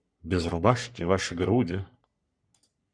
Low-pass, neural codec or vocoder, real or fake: 9.9 kHz; codec, 44.1 kHz, 3.4 kbps, Pupu-Codec; fake